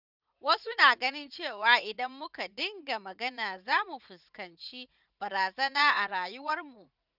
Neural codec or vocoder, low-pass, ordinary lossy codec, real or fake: vocoder, 44.1 kHz, 80 mel bands, Vocos; 5.4 kHz; none; fake